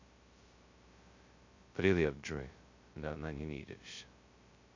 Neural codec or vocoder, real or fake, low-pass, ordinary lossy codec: codec, 16 kHz, 0.2 kbps, FocalCodec; fake; 7.2 kHz; MP3, 48 kbps